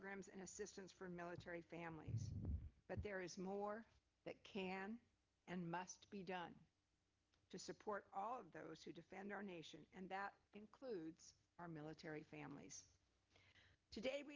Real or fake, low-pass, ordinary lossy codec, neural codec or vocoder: real; 7.2 kHz; Opus, 24 kbps; none